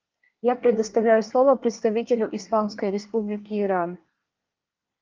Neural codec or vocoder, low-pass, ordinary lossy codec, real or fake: codec, 24 kHz, 1 kbps, SNAC; 7.2 kHz; Opus, 32 kbps; fake